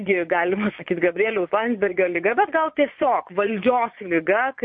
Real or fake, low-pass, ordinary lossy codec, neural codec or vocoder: fake; 9.9 kHz; MP3, 32 kbps; vocoder, 22.05 kHz, 80 mel bands, Vocos